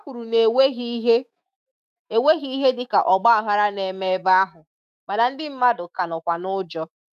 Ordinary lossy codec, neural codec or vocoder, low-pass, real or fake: none; autoencoder, 48 kHz, 128 numbers a frame, DAC-VAE, trained on Japanese speech; 14.4 kHz; fake